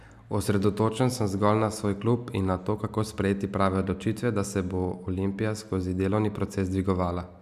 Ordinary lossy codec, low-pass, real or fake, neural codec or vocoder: none; 14.4 kHz; real; none